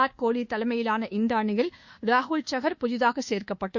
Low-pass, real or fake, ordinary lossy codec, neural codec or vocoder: 7.2 kHz; fake; Opus, 64 kbps; codec, 24 kHz, 1.2 kbps, DualCodec